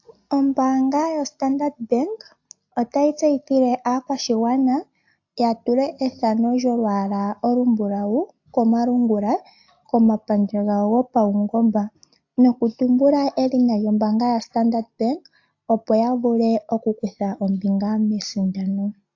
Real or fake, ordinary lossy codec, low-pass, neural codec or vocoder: real; AAC, 48 kbps; 7.2 kHz; none